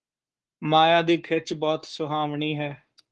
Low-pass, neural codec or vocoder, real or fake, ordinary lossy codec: 10.8 kHz; codec, 44.1 kHz, 7.8 kbps, Pupu-Codec; fake; Opus, 16 kbps